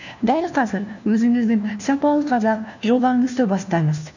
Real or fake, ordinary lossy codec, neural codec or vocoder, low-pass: fake; none; codec, 16 kHz, 1 kbps, FunCodec, trained on LibriTTS, 50 frames a second; 7.2 kHz